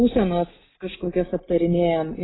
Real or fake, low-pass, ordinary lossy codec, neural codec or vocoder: real; 7.2 kHz; AAC, 16 kbps; none